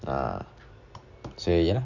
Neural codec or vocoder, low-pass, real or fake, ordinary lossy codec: none; 7.2 kHz; real; none